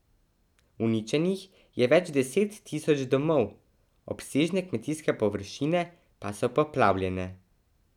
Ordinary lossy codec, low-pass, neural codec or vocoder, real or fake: none; 19.8 kHz; none; real